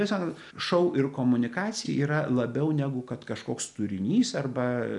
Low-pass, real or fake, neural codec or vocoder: 10.8 kHz; real; none